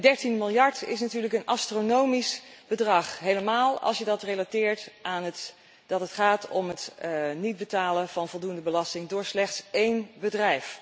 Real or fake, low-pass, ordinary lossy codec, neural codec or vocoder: real; none; none; none